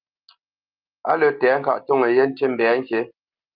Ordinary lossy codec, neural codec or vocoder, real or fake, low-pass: Opus, 32 kbps; none; real; 5.4 kHz